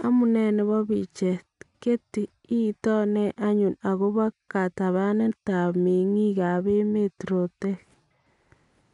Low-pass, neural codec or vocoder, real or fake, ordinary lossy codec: 10.8 kHz; none; real; none